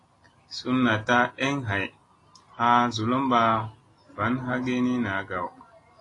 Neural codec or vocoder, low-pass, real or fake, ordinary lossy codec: none; 10.8 kHz; real; AAC, 32 kbps